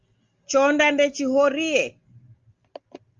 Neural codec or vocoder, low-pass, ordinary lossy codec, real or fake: none; 7.2 kHz; Opus, 32 kbps; real